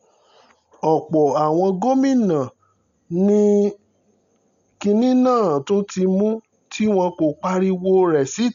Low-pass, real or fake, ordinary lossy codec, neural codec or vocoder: 7.2 kHz; real; none; none